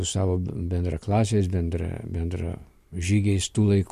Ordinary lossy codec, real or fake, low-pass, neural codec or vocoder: MP3, 64 kbps; real; 14.4 kHz; none